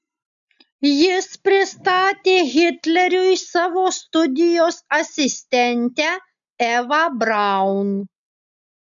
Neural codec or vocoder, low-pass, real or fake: none; 7.2 kHz; real